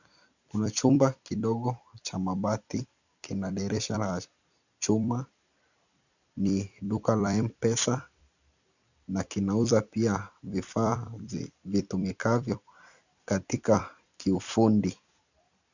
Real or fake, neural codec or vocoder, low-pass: fake; vocoder, 44.1 kHz, 128 mel bands every 256 samples, BigVGAN v2; 7.2 kHz